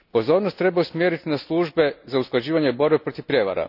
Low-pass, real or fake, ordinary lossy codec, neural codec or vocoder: 5.4 kHz; real; none; none